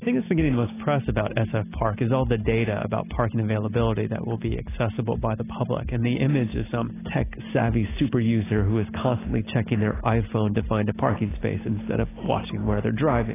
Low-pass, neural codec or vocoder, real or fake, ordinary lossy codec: 3.6 kHz; none; real; AAC, 16 kbps